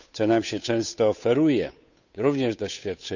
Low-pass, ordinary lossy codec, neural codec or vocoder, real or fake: 7.2 kHz; none; codec, 16 kHz, 8 kbps, FunCodec, trained on Chinese and English, 25 frames a second; fake